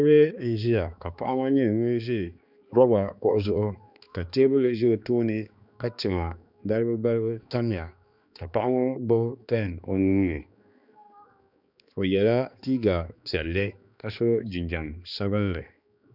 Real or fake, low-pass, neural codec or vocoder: fake; 5.4 kHz; codec, 16 kHz, 2 kbps, X-Codec, HuBERT features, trained on balanced general audio